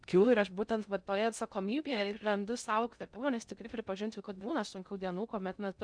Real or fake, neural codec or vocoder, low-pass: fake; codec, 16 kHz in and 24 kHz out, 0.6 kbps, FocalCodec, streaming, 2048 codes; 9.9 kHz